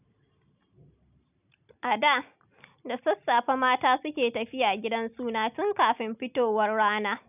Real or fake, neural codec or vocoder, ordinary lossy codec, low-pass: real; none; none; 3.6 kHz